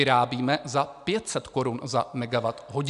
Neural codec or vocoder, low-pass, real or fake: none; 10.8 kHz; real